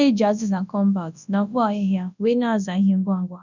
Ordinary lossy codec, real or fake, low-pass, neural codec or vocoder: none; fake; 7.2 kHz; codec, 24 kHz, 0.9 kbps, WavTokenizer, large speech release